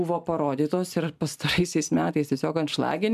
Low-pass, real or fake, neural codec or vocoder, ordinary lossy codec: 14.4 kHz; real; none; MP3, 96 kbps